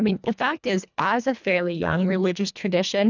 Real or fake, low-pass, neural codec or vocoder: fake; 7.2 kHz; codec, 24 kHz, 1.5 kbps, HILCodec